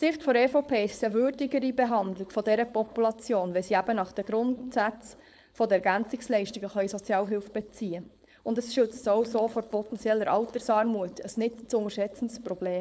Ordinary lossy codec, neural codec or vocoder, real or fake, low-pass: none; codec, 16 kHz, 4.8 kbps, FACodec; fake; none